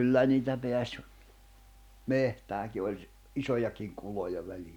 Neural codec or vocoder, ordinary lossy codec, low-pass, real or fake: none; none; 19.8 kHz; real